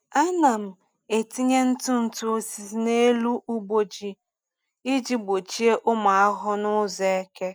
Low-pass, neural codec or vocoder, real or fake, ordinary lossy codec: none; none; real; none